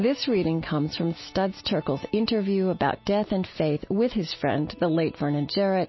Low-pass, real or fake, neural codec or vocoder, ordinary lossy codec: 7.2 kHz; real; none; MP3, 24 kbps